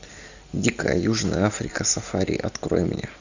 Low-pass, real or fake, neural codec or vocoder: 7.2 kHz; real; none